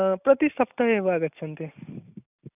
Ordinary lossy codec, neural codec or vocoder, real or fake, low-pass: none; none; real; 3.6 kHz